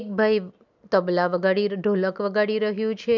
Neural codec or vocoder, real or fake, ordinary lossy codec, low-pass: none; real; none; 7.2 kHz